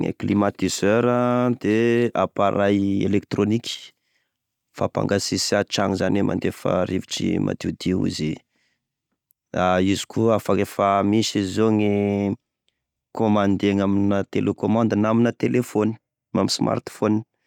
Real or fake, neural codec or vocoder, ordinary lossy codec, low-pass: real; none; none; 19.8 kHz